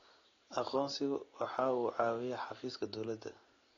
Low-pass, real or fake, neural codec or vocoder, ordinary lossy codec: 7.2 kHz; real; none; AAC, 32 kbps